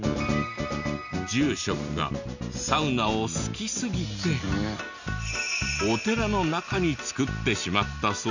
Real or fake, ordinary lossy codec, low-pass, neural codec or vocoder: real; none; 7.2 kHz; none